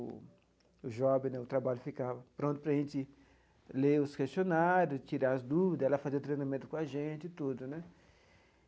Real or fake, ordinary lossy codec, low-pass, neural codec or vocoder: real; none; none; none